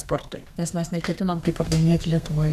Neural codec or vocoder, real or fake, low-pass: codec, 32 kHz, 1.9 kbps, SNAC; fake; 14.4 kHz